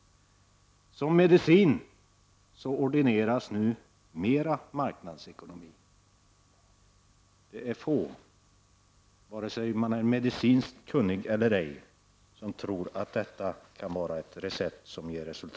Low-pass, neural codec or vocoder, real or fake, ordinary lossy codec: none; none; real; none